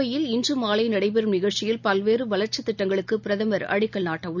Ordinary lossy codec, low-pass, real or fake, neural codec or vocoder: none; 7.2 kHz; real; none